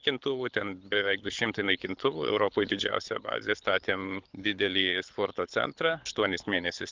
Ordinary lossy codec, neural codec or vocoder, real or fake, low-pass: Opus, 24 kbps; codec, 24 kHz, 6 kbps, HILCodec; fake; 7.2 kHz